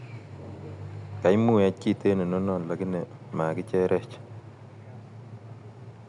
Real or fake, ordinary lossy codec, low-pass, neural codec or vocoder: real; none; 10.8 kHz; none